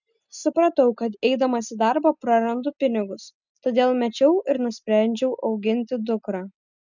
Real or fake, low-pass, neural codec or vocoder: real; 7.2 kHz; none